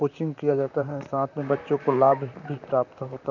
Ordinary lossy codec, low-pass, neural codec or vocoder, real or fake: none; 7.2 kHz; vocoder, 44.1 kHz, 128 mel bands, Pupu-Vocoder; fake